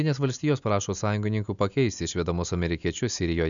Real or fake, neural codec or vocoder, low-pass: real; none; 7.2 kHz